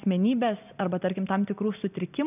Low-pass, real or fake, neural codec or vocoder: 3.6 kHz; real; none